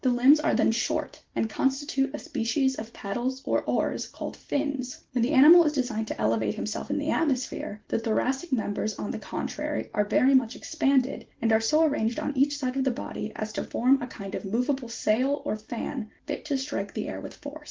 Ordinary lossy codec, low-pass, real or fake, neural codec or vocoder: Opus, 16 kbps; 7.2 kHz; real; none